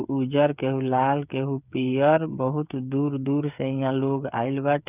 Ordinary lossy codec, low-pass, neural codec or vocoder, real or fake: none; 3.6 kHz; codec, 16 kHz, 8 kbps, FreqCodec, smaller model; fake